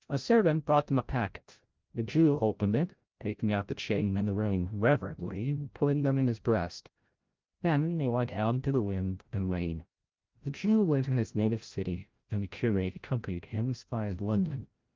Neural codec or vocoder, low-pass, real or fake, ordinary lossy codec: codec, 16 kHz, 0.5 kbps, FreqCodec, larger model; 7.2 kHz; fake; Opus, 32 kbps